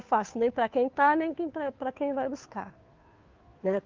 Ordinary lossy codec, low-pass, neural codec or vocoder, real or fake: Opus, 24 kbps; 7.2 kHz; codec, 16 kHz, 2 kbps, FunCodec, trained on Chinese and English, 25 frames a second; fake